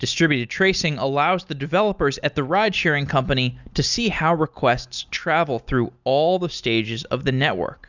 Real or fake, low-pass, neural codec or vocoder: real; 7.2 kHz; none